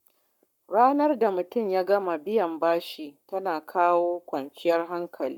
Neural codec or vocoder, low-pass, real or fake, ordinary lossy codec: codec, 44.1 kHz, 7.8 kbps, Pupu-Codec; 19.8 kHz; fake; none